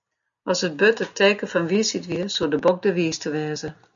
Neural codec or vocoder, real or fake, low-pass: none; real; 7.2 kHz